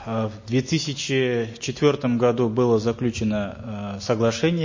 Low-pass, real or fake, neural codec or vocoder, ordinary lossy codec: 7.2 kHz; real; none; MP3, 32 kbps